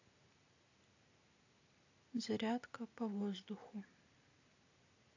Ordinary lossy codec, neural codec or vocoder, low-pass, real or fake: AAC, 48 kbps; none; 7.2 kHz; real